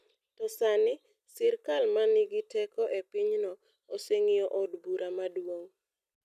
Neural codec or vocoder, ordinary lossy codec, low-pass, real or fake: none; none; 14.4 kHz; real